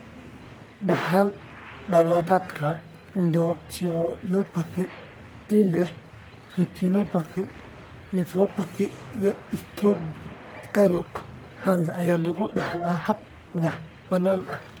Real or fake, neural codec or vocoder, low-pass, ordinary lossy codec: fake; codec, 44.1 kHz, 1.7 kbps, Pupu-Codec; none; none